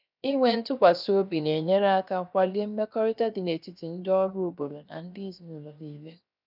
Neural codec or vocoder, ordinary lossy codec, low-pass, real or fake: codec, 16 kHz, 0.7 kbps, FocalCodec; none; 5.4 kHz; fake